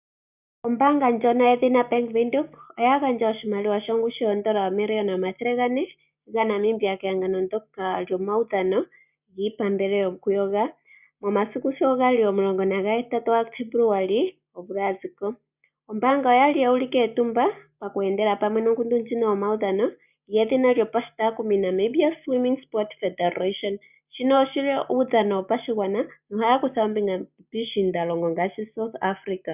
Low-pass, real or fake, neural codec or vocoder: 3.6 kHz; real; none